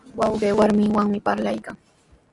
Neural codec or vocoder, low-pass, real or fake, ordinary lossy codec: none; 10.8 kHz; real; AAC, 64 kbps